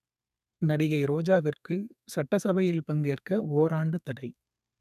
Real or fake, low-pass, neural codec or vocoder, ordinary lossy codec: fake; 14.4 kHz; codec, 32 kHz, 1.9 kbps, SNAC; none